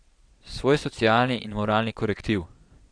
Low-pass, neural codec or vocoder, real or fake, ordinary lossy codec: 9.9 kHz; vocoder, 22.05 kHz, 80 mel bands, Vocos; fake; AAC, 64 kbps